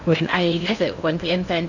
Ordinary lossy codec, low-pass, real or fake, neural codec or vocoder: none; 7.2 kHz; fake; codec, 16 kHz in and 24 kHz out, 0.8 kbps, FocalCodec, streaming, 65536 codes